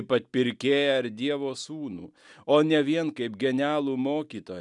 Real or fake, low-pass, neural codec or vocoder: real; 10.8 kHz; none